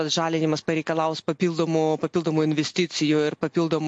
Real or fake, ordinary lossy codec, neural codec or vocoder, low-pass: real; MP3, 48 kbps; none; 9.9 kHz